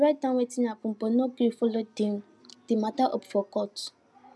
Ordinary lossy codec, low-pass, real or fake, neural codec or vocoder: none; none; real; none